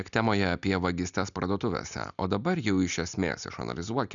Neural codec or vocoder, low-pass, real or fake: none; 7.2 kHz; real